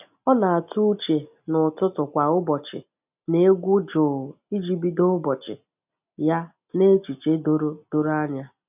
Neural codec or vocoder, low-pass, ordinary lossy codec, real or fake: none; 3.6 kHz; none; real